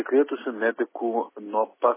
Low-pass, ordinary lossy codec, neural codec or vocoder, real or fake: 3.6 kHz; MP3, 16 kbps; none; real